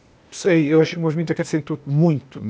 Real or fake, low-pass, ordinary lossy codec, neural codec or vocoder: fake; none; none; codec, 16 kHz, 0.8 kbps, ZipCodec